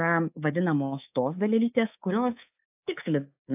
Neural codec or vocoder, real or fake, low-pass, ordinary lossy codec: codec, 24 kHz, 3.1 kbps, DualCodec; fake; 3.6 kHz; AAC, 32 kbps